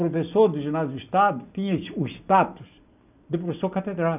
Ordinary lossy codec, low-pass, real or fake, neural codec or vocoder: none; 3.6 kHz; real; none